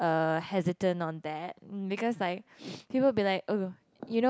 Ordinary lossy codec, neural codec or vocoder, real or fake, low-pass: none; none; real; none